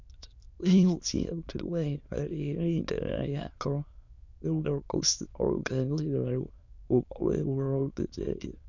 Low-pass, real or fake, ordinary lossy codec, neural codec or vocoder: 7.2 kHz; fake; none; autoencoder, 22.05 kHz, a latent of 192 numbers a frame, VITS, trained on many speakers